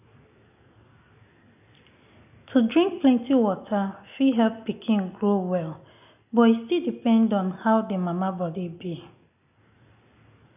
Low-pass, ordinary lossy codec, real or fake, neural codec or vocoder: 3.6 kHz; none; real; none